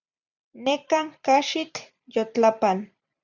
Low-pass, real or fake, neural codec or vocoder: 7.2 kHz; fake; vocoder, 44.1 kHz, 128 mel bands every 256 samples, BigVGAN v2